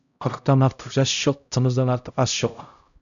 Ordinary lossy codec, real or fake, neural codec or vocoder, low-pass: MP3, 96 kbps; fake; codec, 16 kHz, 0.5 kbps, X-Codec, HuBERT features, trained on LibriSpeech; 7.2 kHz